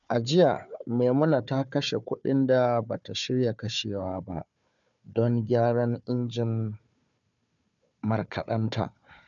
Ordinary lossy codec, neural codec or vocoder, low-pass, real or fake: none; codec, 16 kHz, 4 kbps, FunCodec, trained on Chinese and English, 50 frames a second; 7.2 kHz; fake